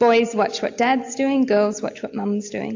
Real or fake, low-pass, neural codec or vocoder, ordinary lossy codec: real; 7.2 kHz; none; AAC, 48 kbps